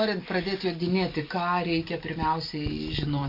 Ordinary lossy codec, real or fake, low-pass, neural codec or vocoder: MP3, 32 kbps; real; 5.4 kHz; none